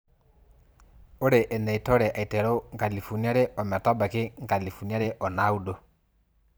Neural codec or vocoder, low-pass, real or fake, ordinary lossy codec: vocoder, 44.1 kHz, 128 mel bands every 512 samples, BigVGAN v2; none; fake; none